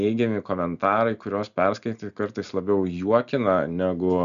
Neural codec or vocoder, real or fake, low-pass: none; real; 7.2 kHz